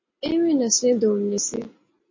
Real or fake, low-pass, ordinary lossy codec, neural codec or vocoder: real; 7.2 kHz; MP3, 32 kbps; none